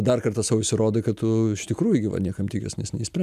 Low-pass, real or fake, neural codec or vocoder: 14.4 kHz; real; none